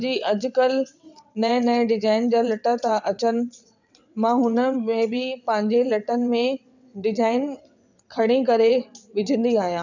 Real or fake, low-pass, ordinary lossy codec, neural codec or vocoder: fake; 7.2 kHz; none; vocoder, 22.05 kHz, 80 mel bands, WaveNeXt